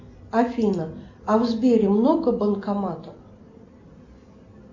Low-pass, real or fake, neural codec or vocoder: 7.2 kHz; real; none